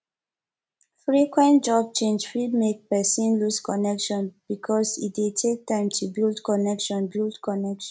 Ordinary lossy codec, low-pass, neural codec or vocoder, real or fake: none; none; none; real